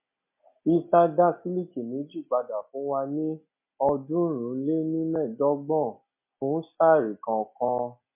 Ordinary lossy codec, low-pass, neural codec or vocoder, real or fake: MP3, 32 kbps; 3.6 kHz; none; real